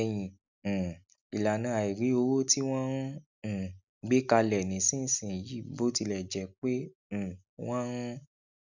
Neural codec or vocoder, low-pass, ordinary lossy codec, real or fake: none; 7.2 kHz; none; real